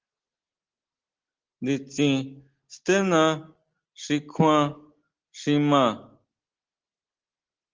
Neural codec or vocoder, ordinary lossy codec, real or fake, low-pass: none; Opus, 16 kbps; real; 7.2 kHz